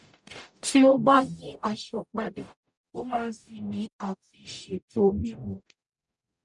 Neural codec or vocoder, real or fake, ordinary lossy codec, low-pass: codec, 44.1 kHz, 0.9 kbps, DAC; fake; none; 10.8 kHz